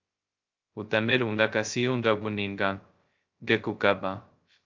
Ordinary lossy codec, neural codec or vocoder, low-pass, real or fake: Opus, 24 kbps; codec, 16 kHz, 0.2 kbps, FocalCodec; 7.2 kHz; fake